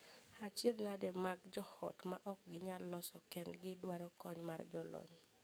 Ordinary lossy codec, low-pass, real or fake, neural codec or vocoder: none; none; fake; codec, 44.1 kHz, 7.8 kbps, DAC